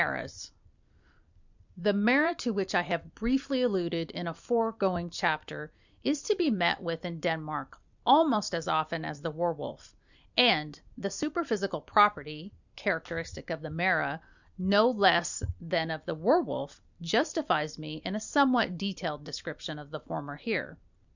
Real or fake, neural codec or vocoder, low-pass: fake; vocoder, 44.1 kHz, 128 mel bands every 256 samples, BigVGAN v2; 7.2 kHz